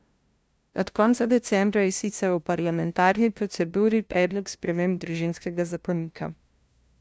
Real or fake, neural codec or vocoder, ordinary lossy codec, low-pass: fake; codec, 16 kHz, 0.5 kbps, FunCodec, trained on LibriTTS, 25 frames a second; none; none